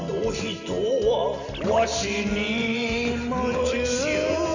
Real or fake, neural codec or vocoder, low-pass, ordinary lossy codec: real; none; 7.2 kHz; none